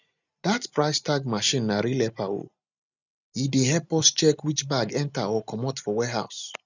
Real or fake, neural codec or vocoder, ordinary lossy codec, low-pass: real; none; none; 7.2 kHz